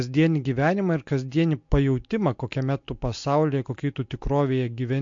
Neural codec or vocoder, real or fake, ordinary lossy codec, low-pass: none; real; MP3, 48 kbps; 7.2 kHz